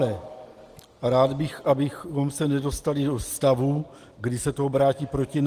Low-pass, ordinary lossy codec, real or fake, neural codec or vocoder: 14.4 kHz; Opus, 24 kbps; real; none